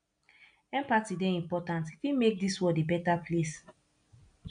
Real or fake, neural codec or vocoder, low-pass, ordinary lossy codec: real; none; 9.9 kHz; none